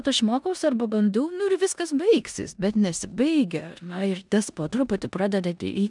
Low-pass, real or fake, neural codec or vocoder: 10.8 kHz; fake; codec, 16 kHz in and 24 kHz out, 0.9 kbps, LongCat-Audio-Codec, four codebook decoder